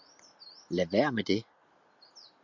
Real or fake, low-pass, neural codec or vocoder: real; 7.2 kHz; none